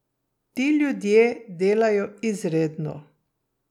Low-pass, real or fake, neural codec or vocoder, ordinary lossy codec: 19.8 kHz; real; none; none